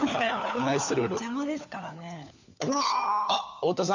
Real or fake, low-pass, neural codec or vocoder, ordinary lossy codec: fake; 7.2 kHz; codec, 16 kHz, 4 kbps, FunCodec, trained on LibriTTS, 50 frames a second; none